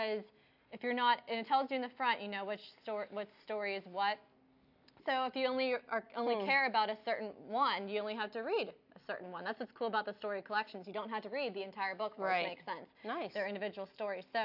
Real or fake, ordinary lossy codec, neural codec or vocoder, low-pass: real; AAC, 48 kbps; none; 5.4 kHz